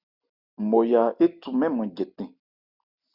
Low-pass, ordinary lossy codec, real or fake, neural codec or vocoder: 5.4 kHz; Opus, 64 kbps; real; none